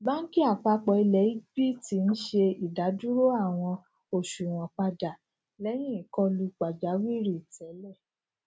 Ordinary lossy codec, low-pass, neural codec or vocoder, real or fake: none; none; none; real